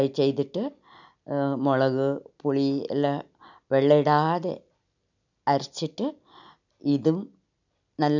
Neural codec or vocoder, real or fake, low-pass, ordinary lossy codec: none; real; 7.2 kHz; none